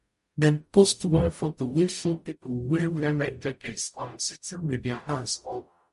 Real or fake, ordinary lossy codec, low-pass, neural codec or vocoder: fake; MP3, 48 kbps; 14.4 kHz; codec, 44.1 kHz, 0.9 kbps, DAC